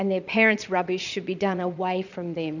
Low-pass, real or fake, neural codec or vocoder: 7.2 kHz; fake; vocoder, 22.05 kHz, 80 mel bands, Vocos